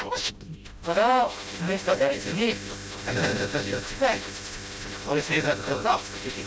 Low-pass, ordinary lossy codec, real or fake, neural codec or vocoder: none; none; fake; codec, 16 kHz, 0.5 kbps, FreqCodec, smaller model